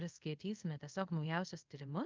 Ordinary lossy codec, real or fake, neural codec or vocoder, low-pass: Opus, 24 kbps; fake; codec, 24 kHz, 0.5 kbps, DualCodec; 7.2 kHz